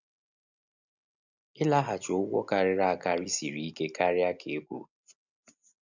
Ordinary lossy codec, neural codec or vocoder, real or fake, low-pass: none; none; real; 7.2 kHz